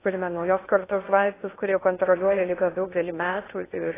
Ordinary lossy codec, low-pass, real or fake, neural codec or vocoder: AAC, 16 kbps; 3.6 kHz; fake; codec, 16 kHz in and 24 kHz out, 0.6 kbps, FocalCodec, streaming, 2048 codes